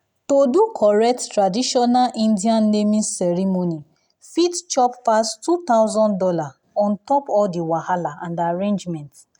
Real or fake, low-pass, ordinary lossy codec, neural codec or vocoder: real; 19.8 kHz; none; none